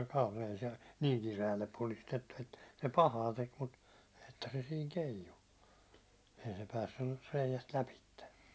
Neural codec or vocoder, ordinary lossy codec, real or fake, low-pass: none; none; real; none